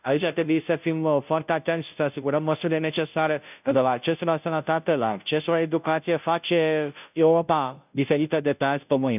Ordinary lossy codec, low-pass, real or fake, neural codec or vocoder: none; 3.6 kHz; fake; codec, 16 kHz, 0.5 kbps, FunCodec, trained on Chinese and English, 25 frames a second